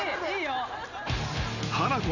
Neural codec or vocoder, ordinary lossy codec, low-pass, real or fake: none; none; 7.2 kHz; real